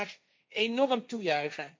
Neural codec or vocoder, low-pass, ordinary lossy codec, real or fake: codec, 16 kHz, 1.1 kbps, Voila-Tokenizer; none; none; fake